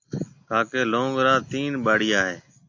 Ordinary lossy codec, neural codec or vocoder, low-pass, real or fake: AAC, 48 kbps; none; 7.2 kHz; real